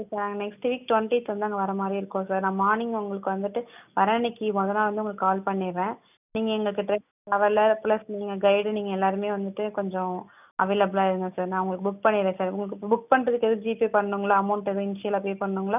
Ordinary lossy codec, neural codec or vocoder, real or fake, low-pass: none; none; real; 3.6 kHz